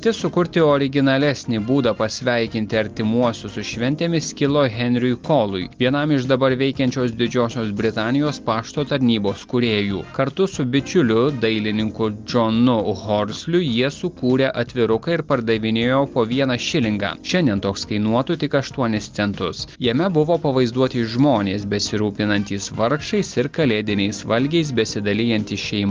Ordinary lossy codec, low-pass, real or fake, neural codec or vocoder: Opus, 32 kbps; 7.2 kHz; real; none